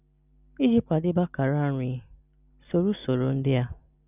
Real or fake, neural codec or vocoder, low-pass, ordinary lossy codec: real; none; 3.6 kHz; none